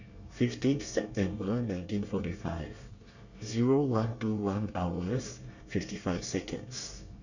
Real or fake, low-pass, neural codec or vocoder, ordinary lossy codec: fake; 7.2 kHz; codec, 24 kHz, 1 kbps, SNAC; none